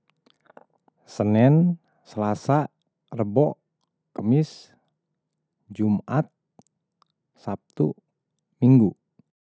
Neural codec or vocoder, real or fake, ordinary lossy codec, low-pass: none; real; none; none